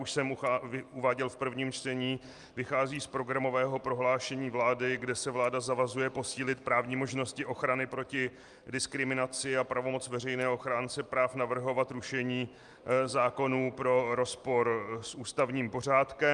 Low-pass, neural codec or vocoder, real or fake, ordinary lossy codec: 10.8 kHz; none; real; Opus, 32 kbps